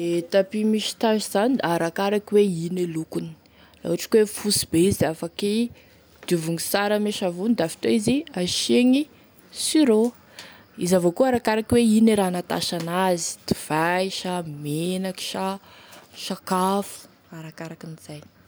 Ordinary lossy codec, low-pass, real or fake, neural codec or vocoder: none; none; real; none